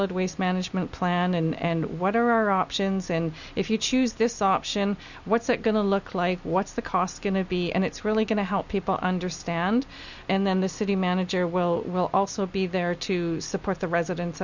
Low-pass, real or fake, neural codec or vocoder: 7.2 kHz; real; none